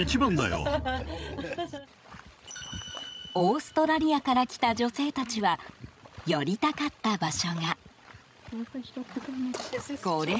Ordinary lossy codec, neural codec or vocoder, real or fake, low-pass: none; codec, 16 kHz, 16 kbps, FreqCodec, larger model; fake; none